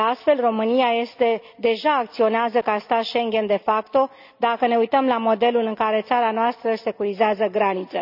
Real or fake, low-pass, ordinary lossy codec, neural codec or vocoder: real; 5.4 kHz; none; none